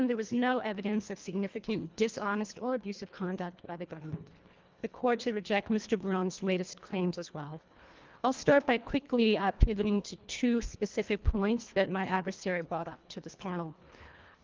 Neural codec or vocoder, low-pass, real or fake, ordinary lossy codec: codec, 24 kHz, 1.5 kbps, HILCodec; 7.2 kHz; fake; Opus, 32 kbps